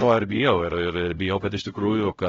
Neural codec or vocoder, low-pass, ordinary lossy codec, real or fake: codec, 16 kHz, 0.5 kbps, X-Codec, HuBERT features, trained on LibriSpeech; 7.2 kHz; AAC, 24 kbps; fake